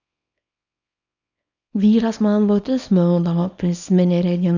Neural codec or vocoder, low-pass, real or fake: codec, 24 kHz, 0.9 kbps, WavTokenizer, small release; 7.2 kHz; fake